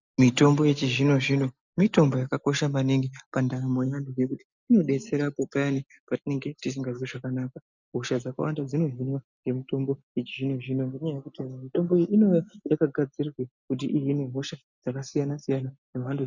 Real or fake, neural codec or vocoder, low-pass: real; none; 7.2 kHz